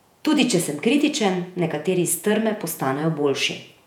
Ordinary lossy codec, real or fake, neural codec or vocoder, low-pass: none; fake; vocoder, 48 kHz, 128 mel bands, Vocos; 19.8 kHz